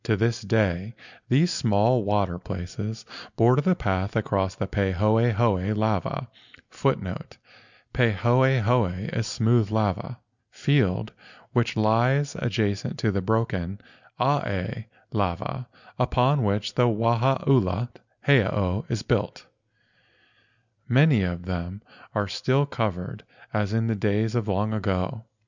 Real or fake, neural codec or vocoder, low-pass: real; none; 7.2 kHz